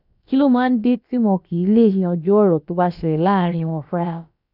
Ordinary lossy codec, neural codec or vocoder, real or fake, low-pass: none; codec, 16 kHz, about 1 kbps, DyCAST, with the encoder's durations; fake; 5.4 kHz